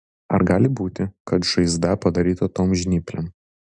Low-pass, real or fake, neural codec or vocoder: 9.9 kHz; real; none